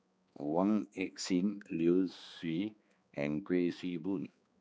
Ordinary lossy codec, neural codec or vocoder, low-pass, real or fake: none; codec, 16 kHz, 2 kbps, X-Codec, HuBERT features, trained on balanced general audio; none; fake